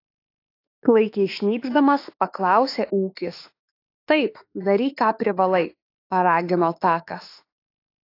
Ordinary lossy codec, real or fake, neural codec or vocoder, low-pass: AAC, 32 kbps; fake; autoencoder, 48 kHz, 32 numbers a frame, DAC-VAE, trained on Japanese speech; 5.4 kHz